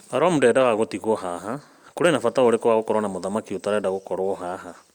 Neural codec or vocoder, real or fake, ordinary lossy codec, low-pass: none; real; Opus, 64 kbps; 19.8 kHz